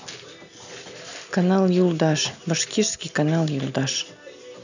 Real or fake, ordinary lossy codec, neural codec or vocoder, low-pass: real; none; none; 7.2 kHz